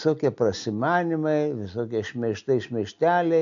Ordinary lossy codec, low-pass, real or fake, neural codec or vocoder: AAC, 64 kbps; 7.2 kHz; real; none